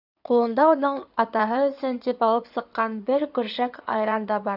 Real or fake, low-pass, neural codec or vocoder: fake; 5.4 kHz; codec, 16 kHz in and 24 kHz out, 2.2 kbps, FireRedTTS-2 codec